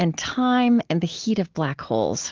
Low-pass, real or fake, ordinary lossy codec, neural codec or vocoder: 7.2 kHz; real; Opus, 16 kbps; none